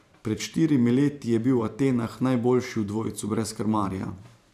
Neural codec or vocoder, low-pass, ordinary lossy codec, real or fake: vocoder, 44.1 kHz, 128 mel bands every 512 samples, BigVGAN v2; 14.4 kHz; none; fake